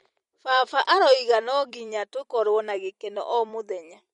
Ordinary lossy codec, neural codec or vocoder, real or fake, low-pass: MP3, 48 kbps; vocoder, 44.1 kHz, 128 mel bands every 256 samples, BigVGAN v2; fake; 19.8 kHz